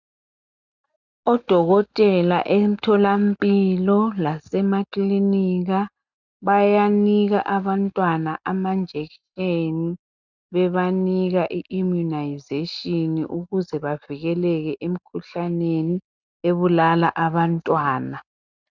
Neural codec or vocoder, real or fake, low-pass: none; real; 7.2 kHz